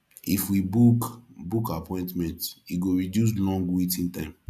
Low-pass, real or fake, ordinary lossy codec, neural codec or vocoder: 14.4 kHz; real; none; none